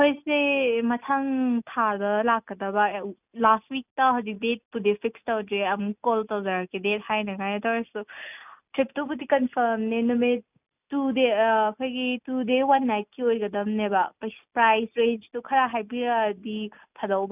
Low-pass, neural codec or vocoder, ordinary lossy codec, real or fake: 3.6 kHz; none; none; real